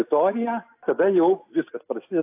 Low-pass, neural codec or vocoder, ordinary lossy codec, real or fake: 3.6 kHz; none; AAC, 32 kbps; real